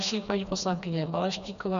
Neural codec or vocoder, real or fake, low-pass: codec, 16 kHz, 2 kbps, FreqCodec, smaller model; fake; 7.2 kHz